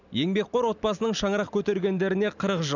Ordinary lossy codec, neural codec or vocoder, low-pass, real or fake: none; none; 7.2 kHz; real